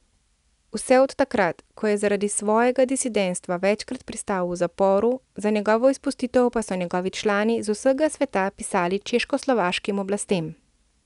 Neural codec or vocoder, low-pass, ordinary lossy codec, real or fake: none; 10.8 kHz; none; real